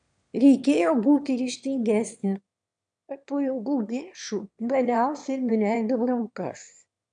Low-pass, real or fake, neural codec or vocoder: 9.9 kHz; fake; autoencoder, 22.05 kHz, a latent of 192 numbers a frame, VITS, trained on one speaker